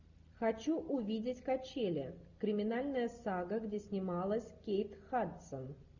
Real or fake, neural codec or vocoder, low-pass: real; none; 7.2 kHz